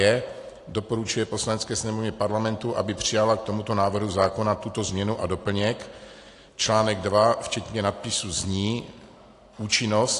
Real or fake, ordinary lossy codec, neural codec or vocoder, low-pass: real; AAC, 48 kbps; none; 10.8 kHz